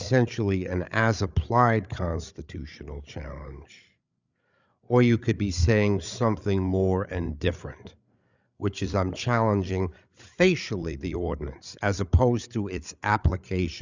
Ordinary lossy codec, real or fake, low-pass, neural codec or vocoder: Opus, 64 kbps; fake; 7.2 kHz; codec, 16 kHz, 8 kbps, FreqCodec, larger model